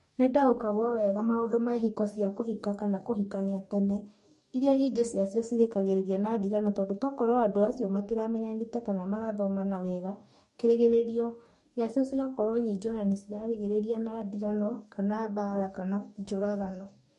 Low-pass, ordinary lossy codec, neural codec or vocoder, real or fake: 14.4 kHz; MP3, 48 kbps; codec, 44.1 kHz, 2.6 kbps, DAC; fake